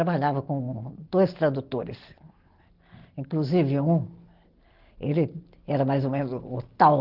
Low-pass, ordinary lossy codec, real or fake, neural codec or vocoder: 5.4 kHz; Opus, 16 kbps; real; none